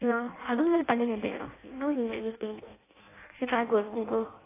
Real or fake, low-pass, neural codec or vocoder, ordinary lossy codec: fake; 3.6 kHz; codec, 16 kHz in and 24 kHz out, 0.6 kbps, FireRedTTS-2 codec; AAC, 16 kbps